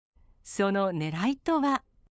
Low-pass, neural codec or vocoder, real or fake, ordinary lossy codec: none; codec, 16 kHz, 8 kbps, FunCodec, trained on LibriTTS, 25 frames a second; fake; none